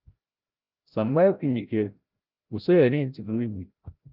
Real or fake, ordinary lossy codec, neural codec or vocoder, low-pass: fake; Opus, 24 kbps; codec, 16 kHz, 0.5 kbps, FreqCodec, larger model; 5.4 kHz